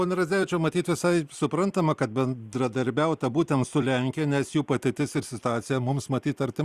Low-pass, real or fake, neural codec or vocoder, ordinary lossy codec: 14.4 kHz; fake; vocoder, 44.1 kHz, 128 mel bands every 256 samples, BigVGAN v2; Opus, 64 kbps